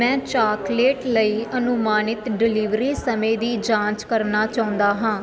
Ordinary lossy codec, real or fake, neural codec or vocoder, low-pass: none; real; none; none